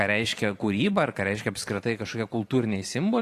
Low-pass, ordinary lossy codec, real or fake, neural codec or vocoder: 14.4 kHz; AAC, 48 kbps; fake; vocoder, 44.1 kHz, 128 mel bands every 512 samples, BigVGAN v2